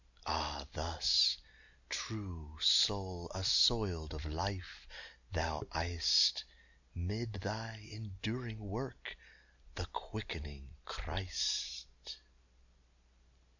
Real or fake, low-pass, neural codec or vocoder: real; 7.2 kHz; none